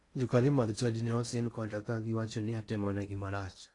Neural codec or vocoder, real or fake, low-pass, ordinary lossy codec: codec, 16 kHz in and 24 kHz out, 0.6 kbps, FocalCodec, streaming, 2048 codes; fake; 10.8 kHz; AAC, 48 kbps